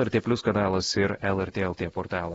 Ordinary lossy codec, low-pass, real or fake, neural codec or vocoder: AAC, 24 kbps; 19.8 kHz; fake; vocoder, 48 kHz, 128 mel bands, Vocos